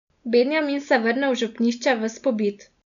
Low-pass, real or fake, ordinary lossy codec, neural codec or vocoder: 7.2 kHz; real; none; none